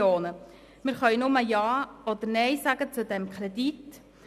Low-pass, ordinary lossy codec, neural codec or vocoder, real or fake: 14.4 kHz; none; none; real